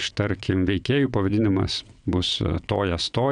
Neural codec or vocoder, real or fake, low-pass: vocoder, 22.05 kHz, 80 mel bands, WaveNeXt; fake; 9.9 kHz